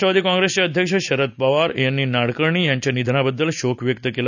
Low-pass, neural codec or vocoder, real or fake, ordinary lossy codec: 7.2 kHz; none; real; none